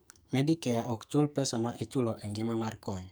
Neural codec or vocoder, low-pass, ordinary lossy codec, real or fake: codec, 44.1 kHz, 2.6 kbps, SNAC; none; none; fake